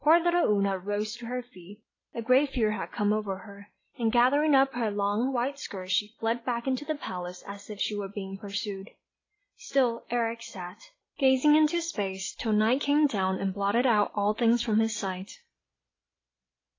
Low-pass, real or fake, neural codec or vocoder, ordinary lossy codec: 7.2 kHz; real; none; AAC, 32 kbps